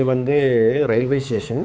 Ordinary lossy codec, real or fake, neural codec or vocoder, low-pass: none; fake; codec, 16 kHz, 4 kbps, X-Codec, HuBERT features, trained on balanced general audio; none